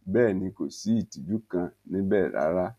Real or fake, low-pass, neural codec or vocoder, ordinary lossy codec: real; 14.4 kHz; none; none